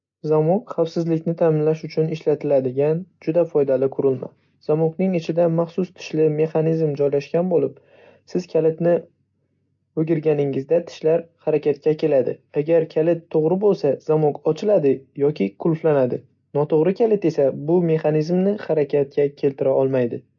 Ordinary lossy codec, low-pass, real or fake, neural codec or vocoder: MP3, 48 kbps; 7.2 kHz; real; none